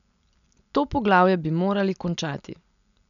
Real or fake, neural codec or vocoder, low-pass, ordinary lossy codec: real; none; 7.2 kHz; none